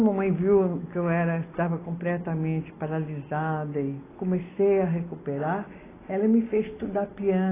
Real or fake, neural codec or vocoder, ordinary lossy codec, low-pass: real; none; AAC, 16 kbps; 3.6 kHz